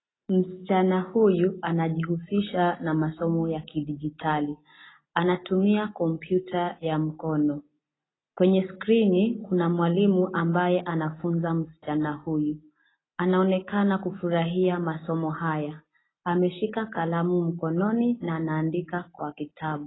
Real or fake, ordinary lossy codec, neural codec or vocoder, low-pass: real; AAC, 16 kbps; none; 7.2 kHz